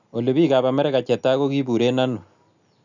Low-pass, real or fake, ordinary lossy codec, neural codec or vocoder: 7.2 kHz; real; none; none